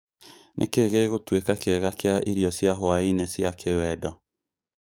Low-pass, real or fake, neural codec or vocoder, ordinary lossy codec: none; fake; codec, 44.1 kHz, 7.8 kbps, DAC; none